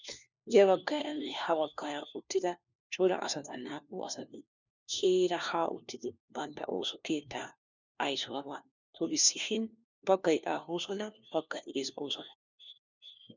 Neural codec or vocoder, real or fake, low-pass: codec, 16 kHz, 1 kbps, FunCodec, trained on LibriTTS, 50 frames a second; fake; 7.2 kHz